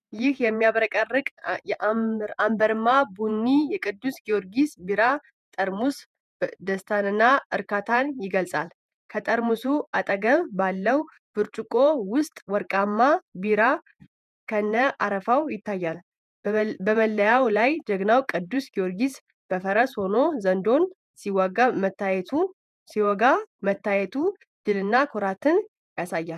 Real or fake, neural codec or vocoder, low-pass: fake; vocoder, 48 kHz, 128 mel bands, Vocos; 14.4 kHz